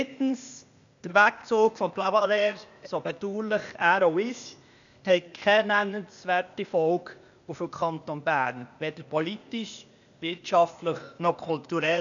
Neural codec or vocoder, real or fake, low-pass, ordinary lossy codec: codec, 16 kHz, 0.8 kbps, ZipCodec; fake; 7.2 kHz; none